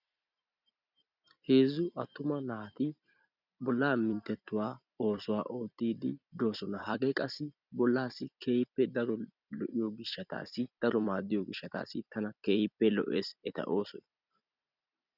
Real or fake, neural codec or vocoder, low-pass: fake; vocoder, 44.1 kHz, 128 mel bands every 256 samples, BigVGAN v2; 5.4 kHz